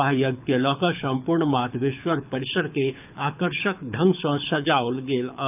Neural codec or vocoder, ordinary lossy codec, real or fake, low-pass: codec, 24 kHz, 6 kbps, HILCodec; none; fake; 3.6 kHz